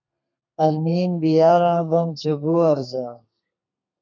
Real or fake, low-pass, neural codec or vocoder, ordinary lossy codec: fake; 7.2 kHz; codec, 32 kHz, 1.9 kbps, SNAC; MP3, 64 kbps